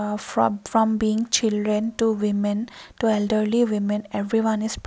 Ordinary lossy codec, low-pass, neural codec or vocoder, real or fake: none; none; none; real